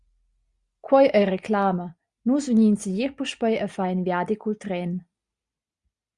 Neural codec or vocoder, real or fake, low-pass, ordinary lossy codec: none; real; 10.8 kHz; Opus, 64 kbps